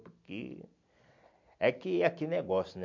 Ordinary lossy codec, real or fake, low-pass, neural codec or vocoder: MP3, 64 kbps; real; 7.2 kHz; none